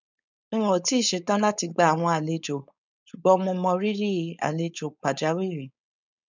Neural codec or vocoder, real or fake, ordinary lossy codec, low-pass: codec, 16 kHz, 4.8 kbps, FACodec; fake; none; 7.2 kHz